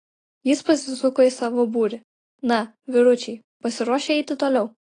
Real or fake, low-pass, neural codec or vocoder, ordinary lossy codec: real; 9.9 kHz; none; AAC, 32 kbps